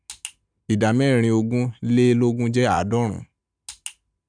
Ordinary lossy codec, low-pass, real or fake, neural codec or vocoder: none; 9.9 kHz; real; none